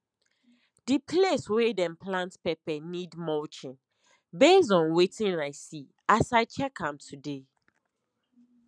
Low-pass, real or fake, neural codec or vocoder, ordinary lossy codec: 9.9 kHz; real; none; none